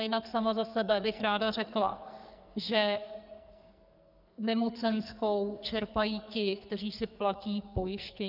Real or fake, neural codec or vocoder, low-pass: fake; codec, 44.1 kHz, 2.6 kbps, SNAC; 5.4 kHz